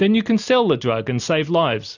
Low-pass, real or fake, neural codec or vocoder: 7.2 kHz; real; none